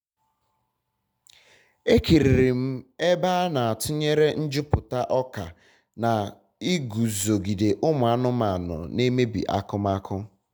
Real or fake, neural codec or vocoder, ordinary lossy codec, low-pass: real; none; none; none